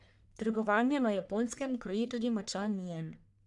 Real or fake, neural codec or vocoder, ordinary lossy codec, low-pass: fake; codec, 44.1 kHz, 1.7 kbps, Pupu-Codec; none; 10.8 kHz